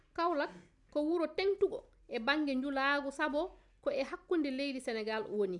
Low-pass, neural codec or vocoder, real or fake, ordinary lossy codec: 10.8 kHz; none; real; none